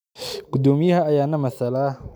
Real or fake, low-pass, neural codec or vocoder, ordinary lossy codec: real; none; none; none